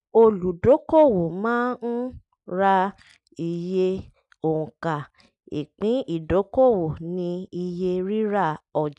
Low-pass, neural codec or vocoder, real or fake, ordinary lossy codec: 10.8 kHz; none; real; none